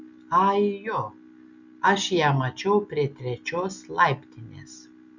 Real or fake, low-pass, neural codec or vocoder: real; 7.2 kHz; none